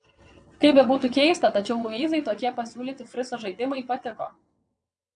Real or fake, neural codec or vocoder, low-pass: fake; vocoder, 22.05 kHz, 80 mel bands, WaveNeXt; 9.9 kHz